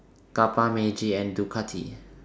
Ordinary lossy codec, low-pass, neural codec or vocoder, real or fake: none; none; none; real